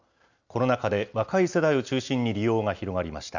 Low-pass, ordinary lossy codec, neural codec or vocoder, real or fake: 7.2 kHz; none; none; real